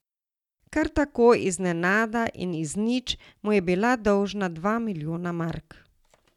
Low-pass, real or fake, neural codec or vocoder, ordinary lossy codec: 19.8 kHz; real; none; none